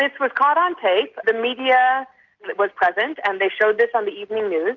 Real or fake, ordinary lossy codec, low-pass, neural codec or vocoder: real; Opus, 64 kbps; 7.2 kHz; none